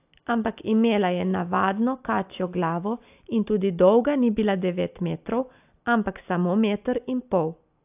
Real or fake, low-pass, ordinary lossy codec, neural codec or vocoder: real; 3.6 kHz; AAC, 32 kbps; none